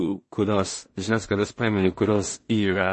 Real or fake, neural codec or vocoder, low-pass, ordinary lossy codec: fake; codec, 16 kHz in and 24 kHz out, 0.4 kbps, LongCat-Audio-Codec, two codebook decoder; 10.8 kHz; MP3, 32 kbps